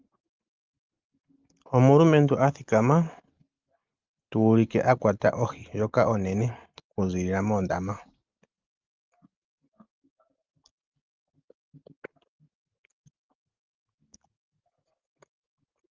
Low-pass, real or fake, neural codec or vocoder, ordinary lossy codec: 7.2 kHz; real; none; Opus, 24 kbps